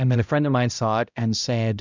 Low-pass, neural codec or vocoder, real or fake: 7.2 kHz; codec, 16 kHz, 0.5 kbps, X-Codec, HuBERT features, trained on balanced general audio; fake